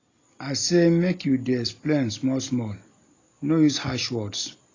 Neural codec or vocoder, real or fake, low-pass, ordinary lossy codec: none; real; 7.2 kHz; AAC, 32 kbps